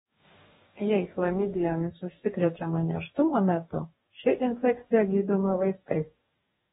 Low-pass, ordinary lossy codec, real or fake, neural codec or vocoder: 19.8 kHz; AAC, 16 kbps; fake; codec, 44.1 kHz, 2.6 kbps, DAC